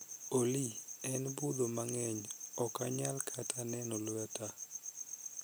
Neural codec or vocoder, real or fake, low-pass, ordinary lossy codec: none; real; none; none